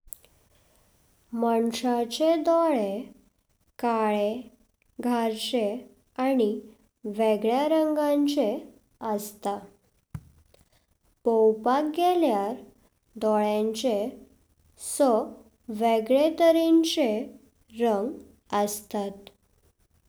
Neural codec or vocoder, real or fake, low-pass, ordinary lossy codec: none; real; none; none